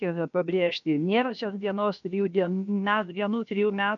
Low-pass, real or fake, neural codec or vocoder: 7.2 kHz; fake; codec, 16 kHz, about 1 kbps, DyCAST, with the encoder's durations